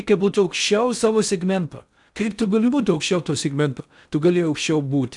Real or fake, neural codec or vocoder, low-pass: fake; codec, 16 kHz in and 24 kHz out, 0.6 kbps, FocalCodec, streaming, 4096 codes; 10.8 kHz